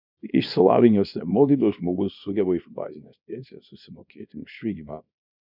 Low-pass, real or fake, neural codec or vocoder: 5.4 kHz; fake; codec, 24 kHz, 0.9 kbps, WavTokenizer, small release